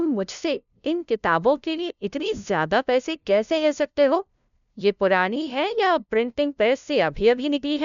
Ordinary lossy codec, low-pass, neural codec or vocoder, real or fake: MP3, 96 kbps; 7.2 kHz; codec, 16 kHz, 0.5 kbps, FunCodec, trained on LibriTTS, 25 frames a second; fake